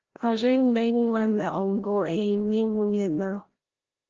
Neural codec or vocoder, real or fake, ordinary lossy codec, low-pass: codec, 16 kHz, 0.5 kbps, FreqCodec, larger model; fake; Opus, 16 kbps; 7.2 kHz